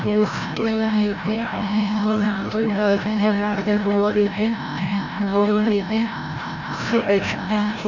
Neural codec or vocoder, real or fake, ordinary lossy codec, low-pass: codec, 16 kHz, 0.5 kbps, FreqCodec, larger model; fake; none; 7.2 kHz